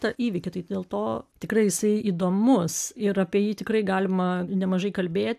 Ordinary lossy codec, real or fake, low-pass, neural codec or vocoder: AAC, 96 kbps; real; 14.4 kHz; none